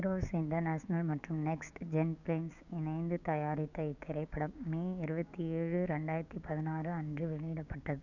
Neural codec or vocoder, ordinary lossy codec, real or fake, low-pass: codec, 16 kHz, 6 kbps, DAC; none; fake; 7.2 kHz